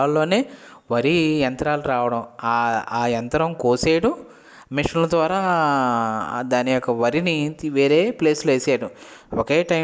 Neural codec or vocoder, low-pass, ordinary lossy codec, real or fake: none; none; none; real